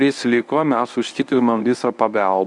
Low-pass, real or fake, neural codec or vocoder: 10.8 kHz; fake; codec, 24 kHz, 0.9 kbps, WavTokenizer, medium speech release version 1